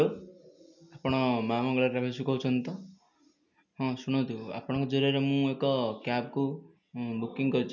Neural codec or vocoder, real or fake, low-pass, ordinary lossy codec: none; real; 7.2 kHz; none